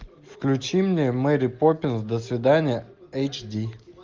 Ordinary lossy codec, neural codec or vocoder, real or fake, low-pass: Opus, 32 kbps; none; real; 7.2 kHz